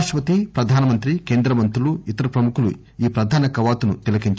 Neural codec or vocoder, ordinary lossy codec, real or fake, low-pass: none; none; real; none